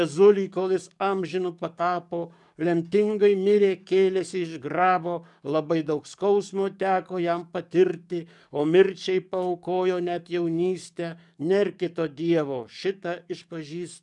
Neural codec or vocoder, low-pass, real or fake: codec, 44.1 kHz, 7.8 kbps, DAC; 10.8 kHz; fake